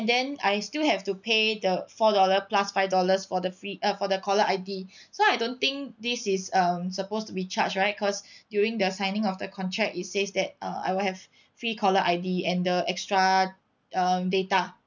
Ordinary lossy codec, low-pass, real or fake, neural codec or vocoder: none; 7.2 kHz; real; none